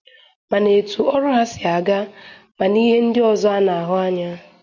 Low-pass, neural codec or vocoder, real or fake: 7.2 kHz; none; real